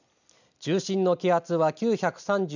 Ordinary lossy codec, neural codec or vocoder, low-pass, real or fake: none; none; 7.2 kHz; real